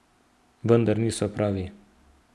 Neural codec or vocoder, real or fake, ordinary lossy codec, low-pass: none; real; none; none